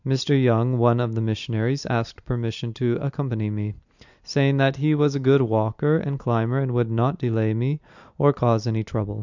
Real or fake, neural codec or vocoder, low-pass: real; none; 7.2 kHz